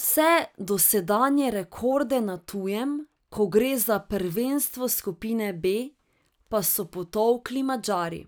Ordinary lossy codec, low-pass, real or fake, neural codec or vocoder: none; none; real; none